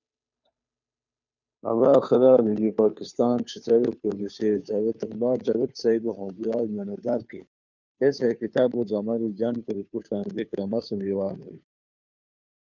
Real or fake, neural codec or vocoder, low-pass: fake; codec, 16 kHz, 2 kbps, FunCodec, trained on Chinese and English, 25 frames a second; 7.2 kHz